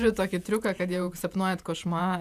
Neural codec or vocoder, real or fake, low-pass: vocoder, 48 kHz, 128 mel bands, Vocos; fake; 14.4 kHz